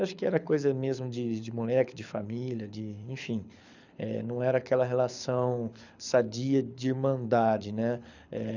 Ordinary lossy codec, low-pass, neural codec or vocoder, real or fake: none; 7.2 kHz; codec, 24 kHz, 6 kbps, HILCodec; fake